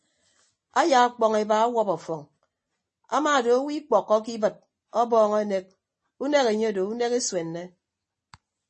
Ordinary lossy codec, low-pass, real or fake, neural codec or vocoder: MP3, 32 kbps; 10.8 kHz; real; none